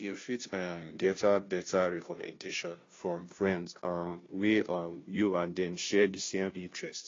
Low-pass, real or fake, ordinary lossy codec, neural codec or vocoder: 7.2 kHz; fake; AAC, 32 kbps; codec, 16 kHz, 0.5 kbps, FunCodec, trained on Chinese and English, 25 frames a second